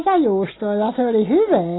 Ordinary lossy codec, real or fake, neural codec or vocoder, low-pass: AAC, 16 kbps; real; none; 7.2 kHz